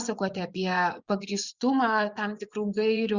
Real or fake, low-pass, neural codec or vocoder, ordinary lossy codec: fake; 7.2 kHz; vocoder, 24 kHz, 100 mel bands, Vocos; Opus, 64 kbps